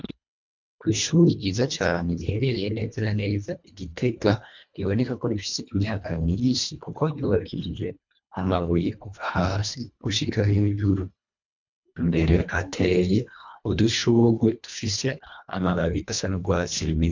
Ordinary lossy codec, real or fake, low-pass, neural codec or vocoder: MP3, 64 kbps; fake; 7.2 kHz; codec, 24 kHz, 1.5 kbps, HILCodec